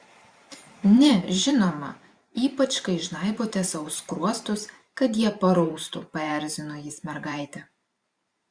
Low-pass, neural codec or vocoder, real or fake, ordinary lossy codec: 9.9 kHz; vocoder, 44.1 kHz, 128 mel bands every 512 samples, BigVGAN v2; fake; Opus, 64 kbps